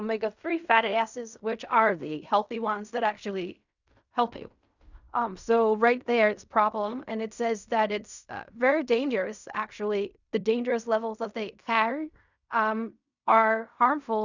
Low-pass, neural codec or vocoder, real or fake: 7.2 kHz; codec, 16 kHz in and 24 kHz out, 0.4 kbps, LongCat-Audio-Codec, fine tuned four codebook decoder; fake